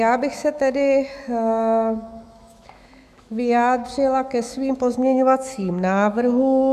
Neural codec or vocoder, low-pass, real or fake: autoencoder, 48 kHz, 128 numbers a frame, DAC-VAE, trained on Japanese speech; 14.4 kHz; fake